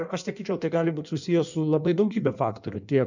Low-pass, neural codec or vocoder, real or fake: 7.2 kHz; codec, 16 kHz in and 24 kHz out, 1.1 kbps, FireRedTTS-2 codec; fake